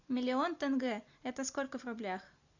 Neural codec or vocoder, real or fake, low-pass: none; real; 7.2 kHz